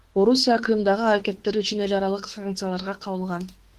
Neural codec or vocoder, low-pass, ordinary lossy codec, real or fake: autoencoder, 48 kHz, 32 numbers a frame, DAC-VAE, trained on Japanese speech; 14.4 kHz; Opus, 16 kbps; fake